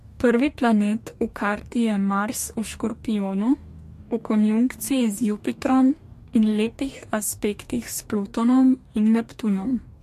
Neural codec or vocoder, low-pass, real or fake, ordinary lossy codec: codec, 44.1 kHz, 2.6 kbps, DAC; 14.4 kHz; fake; MP3, 64 kbps